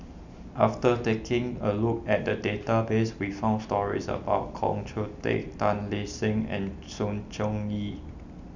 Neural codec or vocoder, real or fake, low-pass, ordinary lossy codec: none; real; 7.2 kHz; none